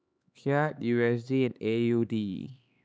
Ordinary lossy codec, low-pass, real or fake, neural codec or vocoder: none; none; fake; codec, 16 kHz, 4 kbps, X-Codec, HuBERT features, trained on LibriSpeech